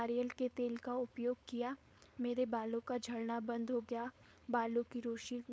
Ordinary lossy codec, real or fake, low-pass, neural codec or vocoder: none; fake; none; codec, 16 kHz, 4.8 kbps, FACodec